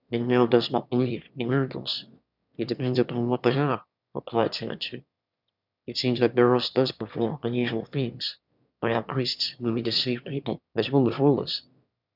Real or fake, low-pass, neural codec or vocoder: fake; 5.4 kHz; autoencoder, 22.05 kHz, a latent of 192 numbers a frame, VITS, trained on one speaker